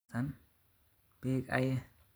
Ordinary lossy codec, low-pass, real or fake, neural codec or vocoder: none; none; fake; vocoder, 44.1 kHz, 128 mel bands every 512 samples, BigVGAN v2